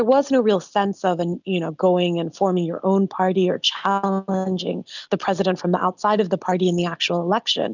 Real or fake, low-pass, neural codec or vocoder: real; 7.2 kHz; none